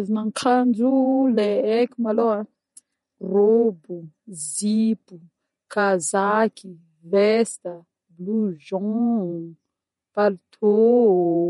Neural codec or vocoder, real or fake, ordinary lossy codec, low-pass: vocoder, 48 kHz, 128 mel bands, Vocos; fake; MP3, 48 kbps; 19.8 kHz